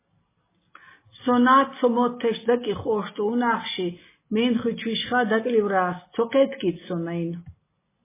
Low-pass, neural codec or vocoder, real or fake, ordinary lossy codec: 3.6 kHz; none; real; MP3, 16 kbps